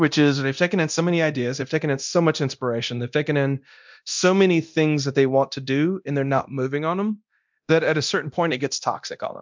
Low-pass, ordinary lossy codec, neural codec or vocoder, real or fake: 7.2 kHz; MP3, 64 kbps; codec, 24 kHz, 0.9 kbps, DualCodec; fake